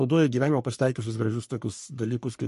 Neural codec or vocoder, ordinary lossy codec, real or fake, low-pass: codec, 44.1 kHz, 3.4 kbps, Pupu-Codec; MP3, 48 kbps; fake; 14.4 kHz